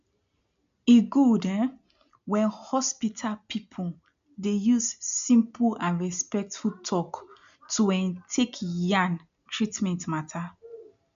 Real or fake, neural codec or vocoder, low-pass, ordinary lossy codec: real; none; 7.2 kHz; MP3, 64 kbps